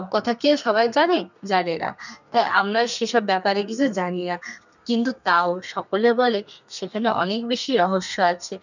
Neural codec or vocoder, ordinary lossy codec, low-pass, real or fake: codec, 32 kHz, 1.9 kbps, SNAC; none; 7.2 kHz; fake